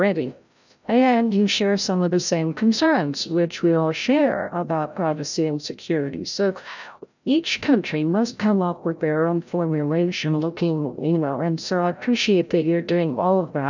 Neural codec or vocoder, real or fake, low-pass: codec, 16 kHz, 0.5 kbps, FreqCodec, larger model; fake; 7.2 kHz